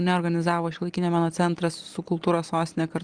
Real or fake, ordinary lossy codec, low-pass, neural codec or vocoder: real; Opus, 32 kbps; 9.9 kHz; none